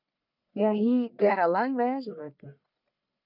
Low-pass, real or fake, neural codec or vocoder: 5.4 kHz; fake; codec, 44.1 kHz, 1.7 kbps, Pupu-Codec